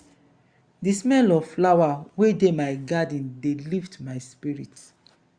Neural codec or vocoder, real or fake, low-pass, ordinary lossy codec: none; real; 9.9 kHz; Opus, 64 kbps